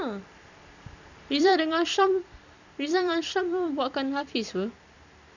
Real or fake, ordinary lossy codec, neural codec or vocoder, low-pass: fake; none; vocoder, 44.1 kHz, 128 mel bands every 256 samples, BigVGAN v2; 7.2 kHz